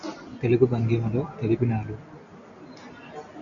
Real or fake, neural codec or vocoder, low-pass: real; none; 7.2 kHz